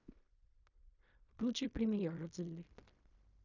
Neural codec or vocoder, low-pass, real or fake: codec, 16 kHz in and 24 kHz out, 0.4 kbps, LongCat-Audio-Codec, fine tuned four codebook decoder; 7.2 kHz; fake